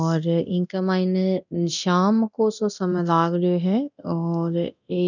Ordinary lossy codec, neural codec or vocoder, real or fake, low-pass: none; codec, 24 kHz, 0.9 kbps, DualCodec; fake; 7.2 kHz